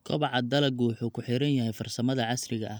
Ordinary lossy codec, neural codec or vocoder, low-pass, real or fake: none; none; none; real